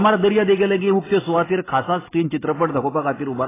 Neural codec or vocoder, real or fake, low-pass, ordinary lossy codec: none; real; 3.6 kHz; AAC, 16 kbps